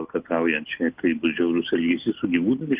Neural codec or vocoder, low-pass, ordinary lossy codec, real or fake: none; 3.6 kHz; Opus, 24 kbps; real